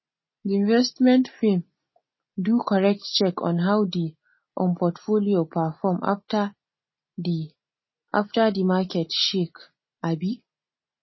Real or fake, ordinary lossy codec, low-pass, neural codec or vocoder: real; MP3, 24 kbps; 7.2 kHz; none